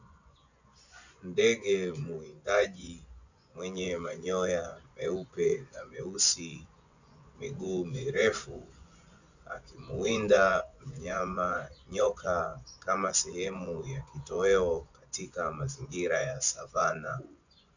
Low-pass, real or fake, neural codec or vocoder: 7.2 kHz; fake; vocoder, 24 kHz, 100 mel bands, Vocos